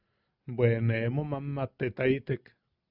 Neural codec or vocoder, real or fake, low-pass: none; real; 5.4 kHz